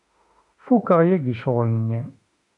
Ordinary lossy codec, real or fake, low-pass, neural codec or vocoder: AAC, 64 kbps; fake; 10.8 kHz; autoencoder, 48 kHz, 32 numbers a frame, DAC-VAE, trained on Japanese speech